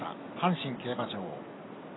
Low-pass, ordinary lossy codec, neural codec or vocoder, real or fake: 7.2 kHz; AAC, 16 kbps; vocoder, 44.1 kHz, 128 mel bands every 256 samples, BigVGAN v2; fake